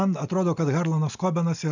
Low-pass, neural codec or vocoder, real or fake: 7.2 kHz; none; real